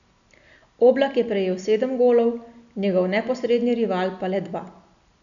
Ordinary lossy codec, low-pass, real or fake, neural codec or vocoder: none; 7.2 kHz; real; none